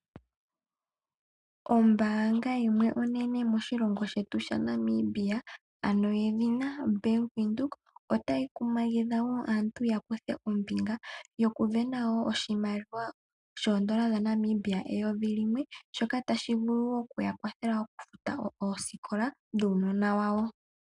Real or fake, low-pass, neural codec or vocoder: real; 10.8 kHz; none